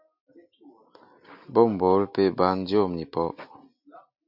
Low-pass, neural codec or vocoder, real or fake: 5.4 kHz; none; real